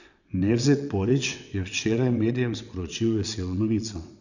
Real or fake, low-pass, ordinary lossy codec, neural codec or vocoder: fake; 7.2 kHz; none; vocoder, 22.05 kHz, 80 mel bands, WaveNeXt